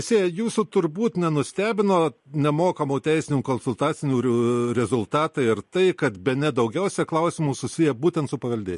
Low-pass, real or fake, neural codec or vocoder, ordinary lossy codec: 14.4 kHz; real; none; MP3, 48 kbps